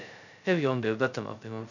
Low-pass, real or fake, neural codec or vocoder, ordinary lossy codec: 7.2 kHz; fake; codec, 16 kHz, 0.2 kbps, FocalCodec; none